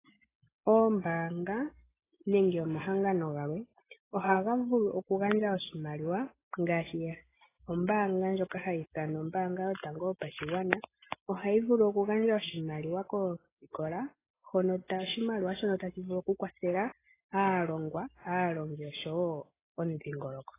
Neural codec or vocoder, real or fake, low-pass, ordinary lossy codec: none; real; 3.6 kHz; AAC, 16 kbps